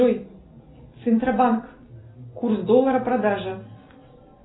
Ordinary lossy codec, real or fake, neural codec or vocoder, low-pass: AAC, 16 kbps; real; none; 7.2 kHz